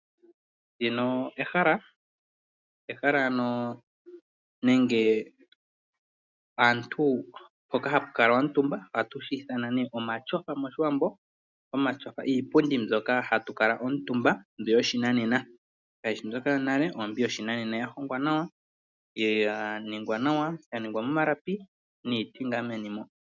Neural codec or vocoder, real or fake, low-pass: none; real; 7.2 kHz